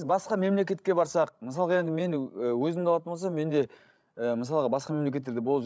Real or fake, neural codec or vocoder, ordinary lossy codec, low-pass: fake; codec, 16 kHz, 16 kbps, FreqCodec, larger model; none; none